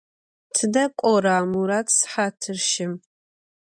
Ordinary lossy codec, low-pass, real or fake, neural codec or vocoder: MP3, 96 kbps; 9.9 kHz; real; none